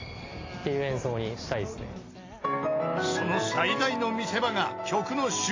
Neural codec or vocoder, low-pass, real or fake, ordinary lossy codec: none; 7.2 kHz; real; AAC, 32 kbps